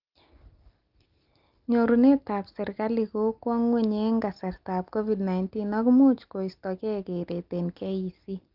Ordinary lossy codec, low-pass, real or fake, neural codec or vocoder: Opus, 32 kbps; 5.4 kHz; real; none